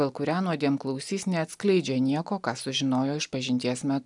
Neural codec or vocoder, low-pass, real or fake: none; 10.8 kHz; real